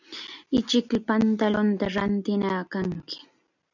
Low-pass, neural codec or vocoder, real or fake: 7.2 kHz; none; real